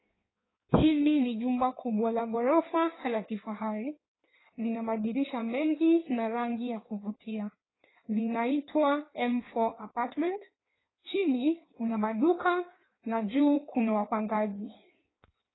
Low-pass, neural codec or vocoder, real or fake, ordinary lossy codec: 7.2 kHz; codec, 16 kHz in and 24 kHz out, 1.1 kbps, FireRedTTS-2 codec; fake; AAC, 16 kbps